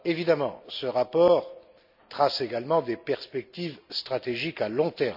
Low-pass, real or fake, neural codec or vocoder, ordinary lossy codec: 5.4 kHz; real; none; none